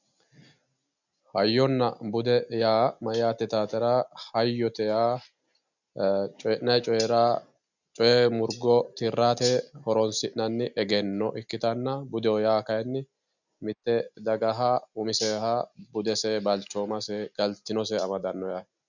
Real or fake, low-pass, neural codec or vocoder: real; 7.2 kHz; none